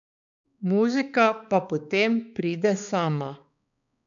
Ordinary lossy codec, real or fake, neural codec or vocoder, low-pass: none; fake; codec, 16 kHz, 4 kbps, X-Codec, HuBERT features, trained on balanced general audio; 7.2 kHz